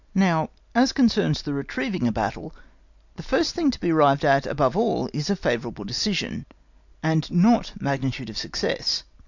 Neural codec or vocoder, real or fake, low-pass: none; real; 7.2 kHz